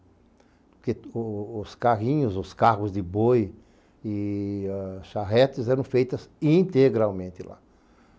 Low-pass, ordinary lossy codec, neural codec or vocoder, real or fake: none; none; none; real